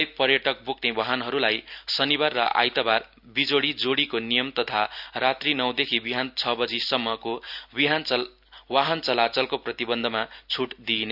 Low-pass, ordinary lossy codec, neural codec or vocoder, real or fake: 5.4 kHz; none; none; real